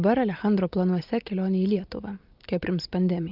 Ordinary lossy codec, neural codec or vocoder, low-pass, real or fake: Opus, 24 kbps; none; 5.4 kHz; real